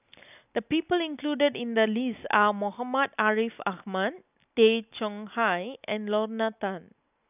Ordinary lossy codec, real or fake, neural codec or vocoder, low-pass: none; real; none; 3.6 kHz